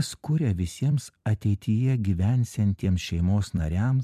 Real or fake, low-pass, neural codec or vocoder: fake; 14.4 kHz; vocoder, 44.1 kHz, 128 mel bands every 256 samples, BigVGAN v2